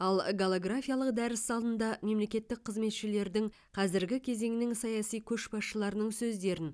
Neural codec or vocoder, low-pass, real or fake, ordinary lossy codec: none; none; real; none